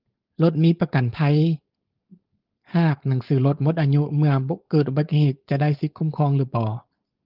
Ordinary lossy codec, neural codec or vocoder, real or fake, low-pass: Opus, 32 kbps; codec, 16 kHz, 4.8 kbps, FACodec; fake; 5.4 kHz